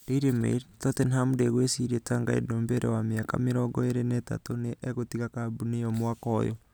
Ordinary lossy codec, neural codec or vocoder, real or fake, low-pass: none; none; real; none